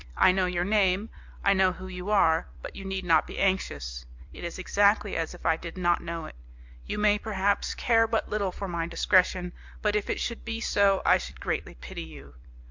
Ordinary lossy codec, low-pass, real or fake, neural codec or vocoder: MP3, 64 kbps; 7.2 kHz; fake; vocoder, 44.1 kHz, 128 mel bands every 256 samples, BigVGAN v2